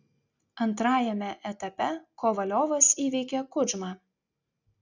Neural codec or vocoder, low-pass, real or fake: none; 7.2 kHz; real